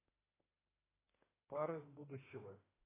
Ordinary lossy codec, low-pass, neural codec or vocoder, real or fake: AAC, 16 kbps; 3.6 kHz; autoencoder, 48 kHz, 32 numbers a frame, DAC-VAE, trained on Japanese speech; fake